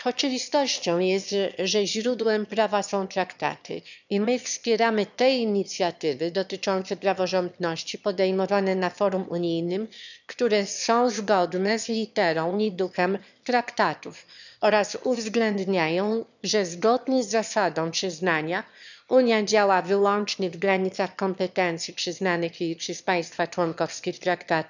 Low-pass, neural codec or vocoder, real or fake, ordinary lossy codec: 7.2 kHz; autoencoder, 22.05 kHz, a latent of 192 numbers a frame, VITS, trained on one speaker; fake; none